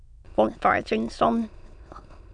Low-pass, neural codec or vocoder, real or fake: 9.9 kHz; autoencoder, 22.05 kHz, a latent of 192 numbers a frame, VITS, trained on many speakers; fake